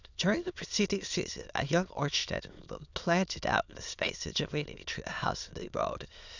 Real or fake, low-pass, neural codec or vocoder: fake; 7.2 kHz; autoencoder, 22.05 kHz, a latent of 192 numbers a frame, VITS, trained on many speakers